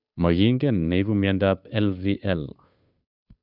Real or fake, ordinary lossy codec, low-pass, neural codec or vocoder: fake; none; 5.4 kHz; codec, 16 kHz, 2 kbps, FunCodec, trained on Chinese and English, 25 frames a second